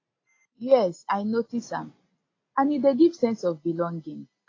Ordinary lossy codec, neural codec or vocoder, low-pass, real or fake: AAC, 32 kbps; none; 7.2 kHz; real